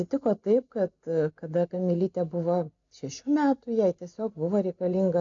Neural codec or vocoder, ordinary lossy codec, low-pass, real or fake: none; AAC, 64 kbps; 7.2 kHz; real